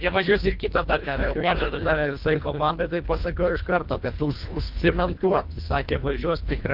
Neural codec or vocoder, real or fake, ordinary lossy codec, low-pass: codec, 24 kHz, 1.5 kbps, HILCodec; fake; Opus, 24 kbps; 5.4 kHz